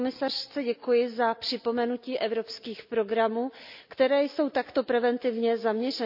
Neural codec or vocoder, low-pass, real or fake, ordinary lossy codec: none; 5.4 kHz; real; AAC, 48 kbps